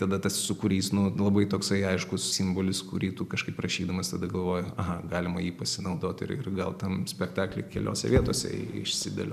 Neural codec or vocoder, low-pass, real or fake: none; 14.4 kHz; real